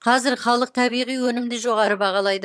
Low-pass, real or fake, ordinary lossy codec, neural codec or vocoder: none; fake; none; vocoder, 22.05 kHz, 80 mel bands, HiFi-GAN